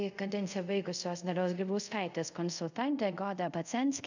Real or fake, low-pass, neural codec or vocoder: fake; 7.2 kHz; codec, 24 kHz, 0.5 kbps, DualCodec